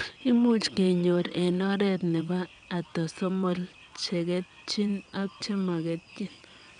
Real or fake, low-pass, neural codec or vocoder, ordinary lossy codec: fake; 9.9 kHz; vocoder, 22.05 kHz, 80 mel bands, WaveNeXt; none